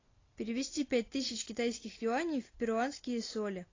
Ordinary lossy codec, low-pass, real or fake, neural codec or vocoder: AAC, 32 kbps; 7.2 kHz; real; none